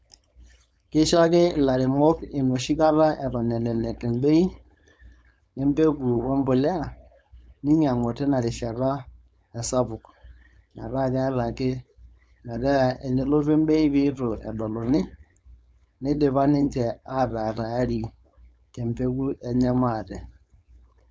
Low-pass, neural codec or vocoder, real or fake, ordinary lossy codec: none; codec, 16 kHz, 4.8 kbps, FACodec; fake; none